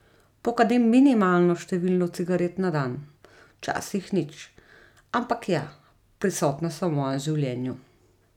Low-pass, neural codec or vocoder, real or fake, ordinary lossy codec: 19.8 kHz; none; real; none